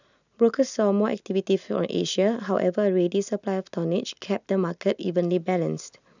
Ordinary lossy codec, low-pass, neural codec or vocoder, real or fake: none; 7.2 kHz; none; real